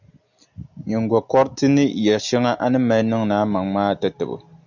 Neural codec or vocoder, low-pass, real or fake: none; 7.2 kHz; real